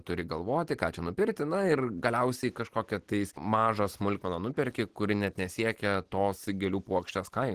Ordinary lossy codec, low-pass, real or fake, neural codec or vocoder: Opus, 16 kbps; 14.4 kHz; real; none